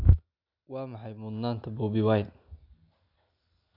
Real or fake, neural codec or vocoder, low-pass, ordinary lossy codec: real; none; 5.4 kHz; none